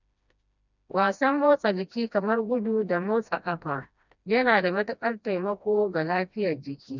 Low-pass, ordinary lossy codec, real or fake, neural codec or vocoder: 7.2 kHz; none; fake; codec, 16 kHz, 1 kbps, FreqCodec, smaller model